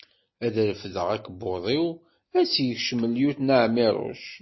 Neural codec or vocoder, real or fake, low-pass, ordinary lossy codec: none; real; 7.2 kHz; MP3, 24 kbps